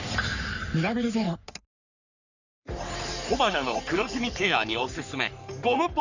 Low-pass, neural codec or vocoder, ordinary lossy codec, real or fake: 7.2 kHz; codec, 44.1 kHz, 3.4 kbps, Pupu-Codec; none; fake